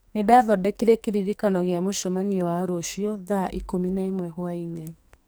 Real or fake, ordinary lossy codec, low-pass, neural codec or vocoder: fake; none; none; codec, 44.1 kHz, 2.6 kbps, SNAC